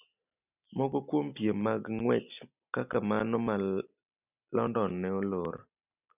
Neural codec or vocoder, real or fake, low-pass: none; real; 3.6 kHz